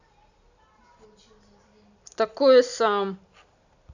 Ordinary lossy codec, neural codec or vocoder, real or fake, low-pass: none; none; real; 7.2 kHz